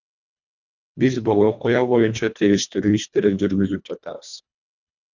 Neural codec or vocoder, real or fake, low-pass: codec, 24 kHz, 1.5 kbps, HILCodec; fake; 7.2 kHz